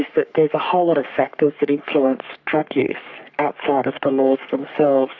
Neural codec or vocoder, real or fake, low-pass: codec, 44.1 kHz, 3.4 kbps, Pupu-Codec; fake; 7.2 kHz